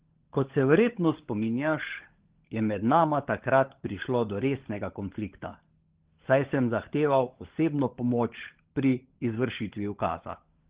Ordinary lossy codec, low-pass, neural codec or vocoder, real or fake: Opus, 16 kbps; 3.6 kHz; codec, 16 kHz, 16 kbps, FunCodec, trained on LibriTTS, 50 frames a second; fake